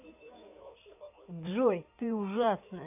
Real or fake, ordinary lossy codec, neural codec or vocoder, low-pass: fake; none; codec, 16 kHz in and 24 kHz out, 2.2 kbps, FireRedTTS-2 codec; 3.6 kHz